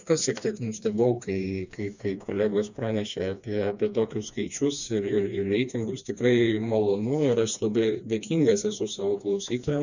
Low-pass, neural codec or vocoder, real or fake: 7.2 kHz; codec, 16 kHz, 2 kbps, FreqCodec, smaller model; fake